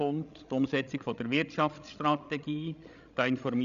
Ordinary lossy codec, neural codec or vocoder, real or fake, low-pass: MP3, 96 kbps; codec, 16 kHz, 16 kbps, FreqCodec, larger model; fake; 7.2 kHz